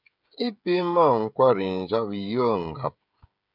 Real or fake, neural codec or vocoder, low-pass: fake; codec, 16 kHz, 16 kbps, FreqCodec, smaller model; 5.4 kHz